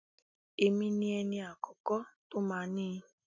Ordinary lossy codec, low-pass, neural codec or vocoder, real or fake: none; 7.2 kHz; none; real